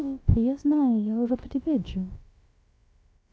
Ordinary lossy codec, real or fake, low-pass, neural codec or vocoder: none; fake; none; codec, 16 kHz, about 1 kbps, DyCAST, with the encoder's durations